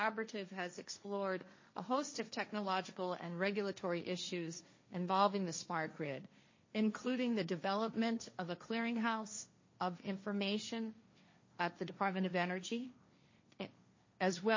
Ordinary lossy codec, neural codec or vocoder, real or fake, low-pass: MP3, 32 kbps; codec, 16 kHz, 1.1 kbps, Voila-Tokenizer; fake; 7.2 kHz